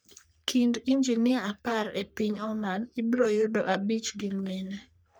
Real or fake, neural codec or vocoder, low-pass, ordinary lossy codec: fake; codec, 44.1 kHz, 3.4 kbps, Pupu-Codec; none; none